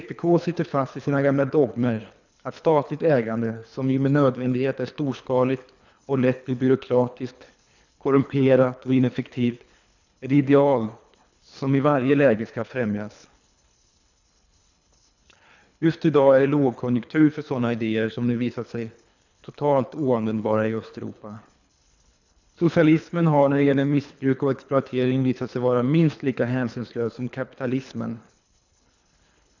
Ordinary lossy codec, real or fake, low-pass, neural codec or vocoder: none; fake; 7.2 kHz; codec, 24 kHz, 3 kbps, HILCodec